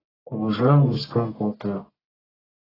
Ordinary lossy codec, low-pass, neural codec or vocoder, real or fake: AAC, 24 kbps; 5.4 kHz; codec, 44.1 kHz, 1.7 kbps, Pupu-Codec; fake